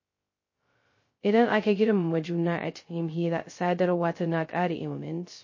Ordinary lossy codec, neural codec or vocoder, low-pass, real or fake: MP3, 32 kbps; codec, 16 kHz, 0.2 kbps, FocalCodec; 7.2 kHz; fake